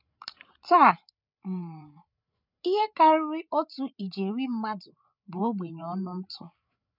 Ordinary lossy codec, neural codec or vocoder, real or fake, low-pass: none; codec, 16 kHz, 8 kbps, FreqCodec, larger model; fake; 5.4 kHz